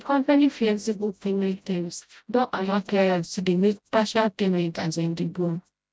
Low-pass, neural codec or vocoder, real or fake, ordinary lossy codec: none; codec, 16 kHz, 0.5 kbps, FreqCodec, smaller model; fake; none